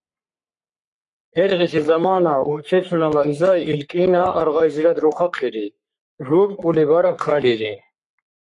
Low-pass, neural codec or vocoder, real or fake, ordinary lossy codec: 10.8 kHz; codec, 44.1 kHz, 3.4 kbps, Pupu-Codec; fake; MP3, 64 kbps